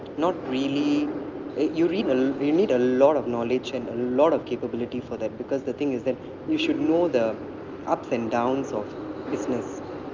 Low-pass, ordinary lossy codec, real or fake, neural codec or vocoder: 7.2 kHz; Opus, 32 kbps; real; none